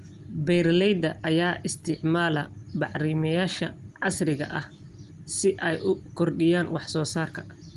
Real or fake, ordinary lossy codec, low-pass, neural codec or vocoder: real; Opus, 24 kbps; 10.8 kHz; none